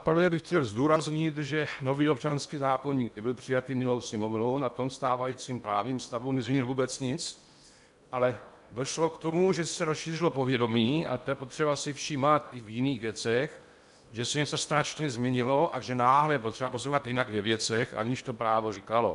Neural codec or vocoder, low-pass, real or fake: codec, 16 kHz in and 24 kHz out, 0.8 kbps, FocalCodec, streaming, 65536 codes; 10.8 kHz; fake